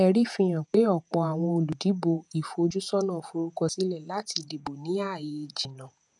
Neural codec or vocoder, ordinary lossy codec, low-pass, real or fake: vocoder, 48 kHz, 128 mel bands, Vocos; none; 10.8 kHz; fake